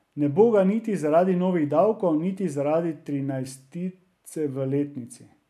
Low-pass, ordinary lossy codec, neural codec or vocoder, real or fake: 14.4 kHz; none; none; real